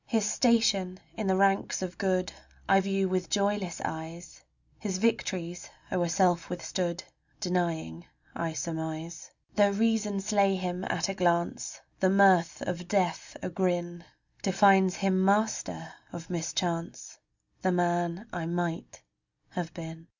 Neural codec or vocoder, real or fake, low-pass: none; real; 7.2 kHz